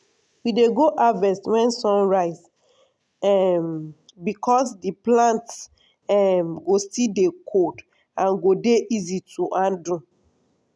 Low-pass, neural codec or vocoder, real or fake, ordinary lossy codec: none; none; real; none